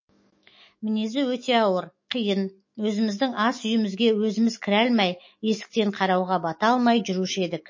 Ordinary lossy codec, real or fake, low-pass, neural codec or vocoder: MP3, 32 kbps; fake; 7.2 kHz; autoencoder, 48 kHz, 128 numbers a frame, DAC-VAE, trained on Japanese speech